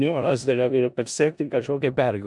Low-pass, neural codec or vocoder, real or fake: 10.8 kHz; codec, 16 kHz in and 24 kHz out, 0.4 kbps, LongCat-Audio-Codec, four codebook decoder; fake